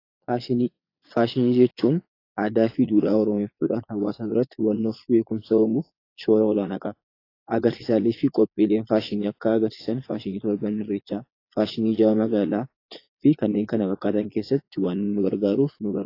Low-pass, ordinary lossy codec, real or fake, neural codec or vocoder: 5.4 kHz; AAC, 32 kbps; fake; vocoder, 22.05 kHz, 80 mel bands, Vocos